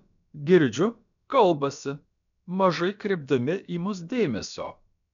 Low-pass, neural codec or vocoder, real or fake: 7.2 kHz; codec, 16 kHz, about 1 kbps, DyCAST, with the encoder's durations; fake